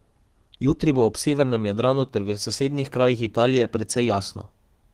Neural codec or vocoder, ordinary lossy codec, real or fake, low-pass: codec, 32 kHz, 1.9 kbps, SNAC; Opus, 24 kbps; fake; 14.4 kHz